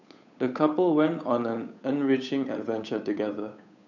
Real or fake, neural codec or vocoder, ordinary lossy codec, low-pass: fake; codec, 16 kHz, 8 kbps, FunCodec, trained on Chinese and English, 25 frames a second; none; 7.2 kHz